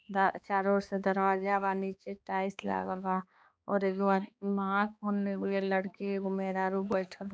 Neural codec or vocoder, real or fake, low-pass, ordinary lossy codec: codec, 16 kHz, 2 kbps, X-Codec, HuBERT features, trained on balanced general audio; fake; none; none